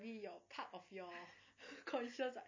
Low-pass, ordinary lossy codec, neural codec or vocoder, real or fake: 7.2 kHz; MP3, 32 kbps; none; real